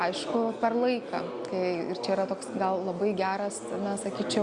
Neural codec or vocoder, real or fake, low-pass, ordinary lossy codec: none; real; 9.9 kHz; Opus, 64 kbps